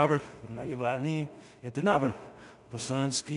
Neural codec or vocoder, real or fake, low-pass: codec, 16 kHz in and 24 kHz out, 0.4 kbps, LongCat-Audio-Codec, two codebook decoder; fake; 10.8 kHz